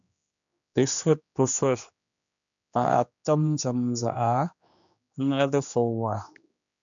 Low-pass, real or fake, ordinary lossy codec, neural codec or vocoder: 7.2 kHz; fake; MP3, 64 kbps; codec, 16 kHz, 2 kbps, X-Codec, HuBERT features, trained on general audio